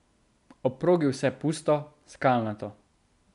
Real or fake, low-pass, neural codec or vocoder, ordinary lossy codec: real; 10.8 kHz; none; none